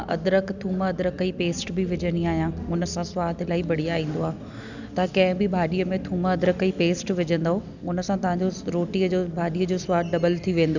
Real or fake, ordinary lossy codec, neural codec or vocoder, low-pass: real; none; none; 7.2 kHz